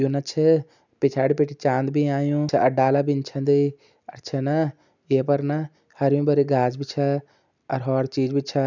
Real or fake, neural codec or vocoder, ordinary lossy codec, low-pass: real; none; none; 7.2 kHz